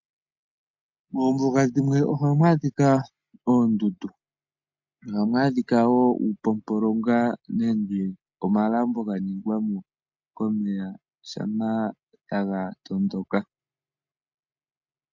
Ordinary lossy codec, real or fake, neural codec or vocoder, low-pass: MP3, 64 kbps; real; none; 7.2 kHz